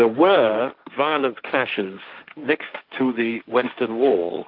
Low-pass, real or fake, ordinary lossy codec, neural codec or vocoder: 5.4 kHz; fake; Opus, 32 kbps; codec, 16 kHz, 1.1 kbps, Voila-Tokenizer